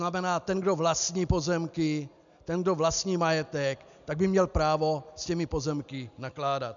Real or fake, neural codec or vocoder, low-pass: real; none; 7.2 kHz